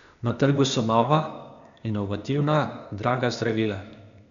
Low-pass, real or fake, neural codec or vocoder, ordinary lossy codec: 7.2 kHz; fake; codec, 16 kHz, 0.8 kbps, ZipCodec; none